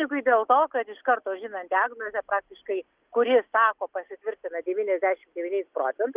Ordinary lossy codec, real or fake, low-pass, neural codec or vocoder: Opus, 32 kbps; real; 3.6 kHz; none